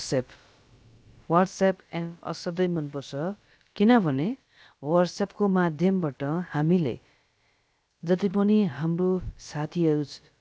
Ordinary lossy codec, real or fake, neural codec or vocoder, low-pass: none; fake; codec, 16 kHz, about 1 kbps, DyCAST, with the encoder's durations; none